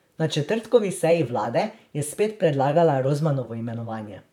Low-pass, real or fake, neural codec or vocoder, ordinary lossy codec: 19.8 kHz; fake; vocoder, 44.1 kHz, 128 mel bands, Pupu-Vocoder; none